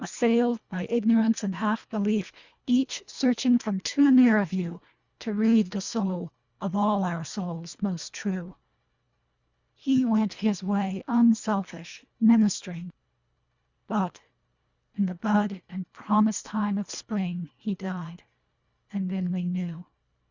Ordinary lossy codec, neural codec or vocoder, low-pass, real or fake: Opus, 64 kbps; codec, 24 kHz, 1.5 kbps, HILCodec; 7.2 kHz; fake